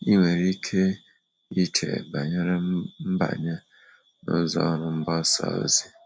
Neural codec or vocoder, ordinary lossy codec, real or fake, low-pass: none; none; real; none